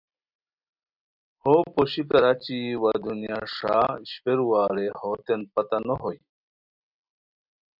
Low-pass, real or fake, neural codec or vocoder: 5.4 kHz; real; none